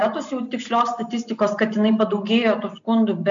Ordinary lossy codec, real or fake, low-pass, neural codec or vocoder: MP3, 64 kbps; real; 7.2 kHz; none